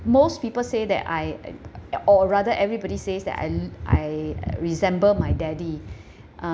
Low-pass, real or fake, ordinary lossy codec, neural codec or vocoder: none; real; none; none